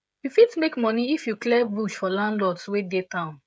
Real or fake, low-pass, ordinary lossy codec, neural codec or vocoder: fake; none; none; codec, 16 kHz, 16 kbps, FreqCodec, smaller model